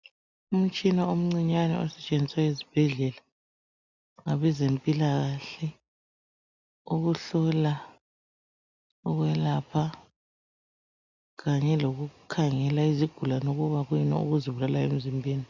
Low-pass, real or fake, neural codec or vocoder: 7.2 kHz; real; none